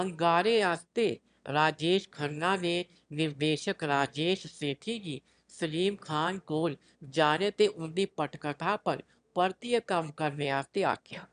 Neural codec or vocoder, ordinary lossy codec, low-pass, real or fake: autoencoder, 22.05 kHz, a latent of 192 numbers a frame, VITS, trained on one speaker; none; 9.9 kHz; fake